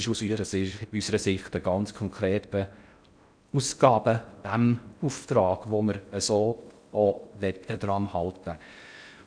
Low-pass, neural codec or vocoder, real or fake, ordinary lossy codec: 9.9 kHz; codec, 16 kHz in and 24 kHz out, 0.6 kbps, FocalCodec, streaming, 4096 codes; fake; none